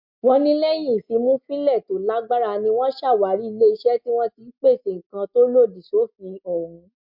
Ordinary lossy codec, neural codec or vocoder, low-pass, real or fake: MP3, 48 kbps; none; 5.4 kHz; real